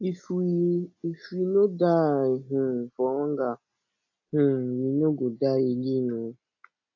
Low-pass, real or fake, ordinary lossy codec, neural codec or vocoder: 7.2 kHz; real; AAC, 48 kbps; none